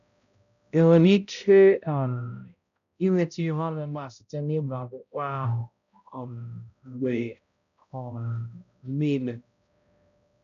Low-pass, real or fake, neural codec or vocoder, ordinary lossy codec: 7.2 kHz; fake; codec, 16 kHz, 0.5 kbps, X-Codec, HuBERT features, trained on balanced general audio; none